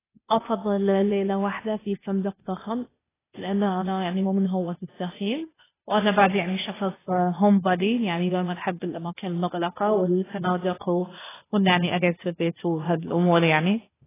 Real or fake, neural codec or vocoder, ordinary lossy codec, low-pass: fake; codec, 16 kHz, 0.8 kbps, ZipCodec; AAC, 16 kbps; 3.6 kHz